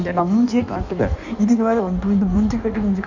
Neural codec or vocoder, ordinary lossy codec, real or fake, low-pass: codec, 16 kHz in and 24 kHz out, 1.1 kbps, FireRedTTS-2 codec; none; fake; 7.2 kHz